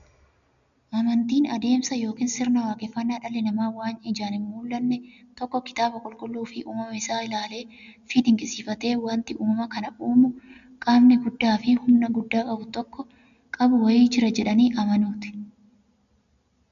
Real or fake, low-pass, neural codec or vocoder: real; 7.2 kHz; none